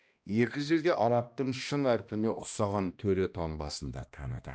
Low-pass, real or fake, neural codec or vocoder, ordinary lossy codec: none; fake; codec, 16 kHz, 1 kbps, X-Codec, HuBERT features, trained on balanced general audio; none